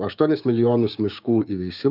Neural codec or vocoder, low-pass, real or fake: codec, 16 kHz, 6 kbps, DAC; 5.4 kHz; fake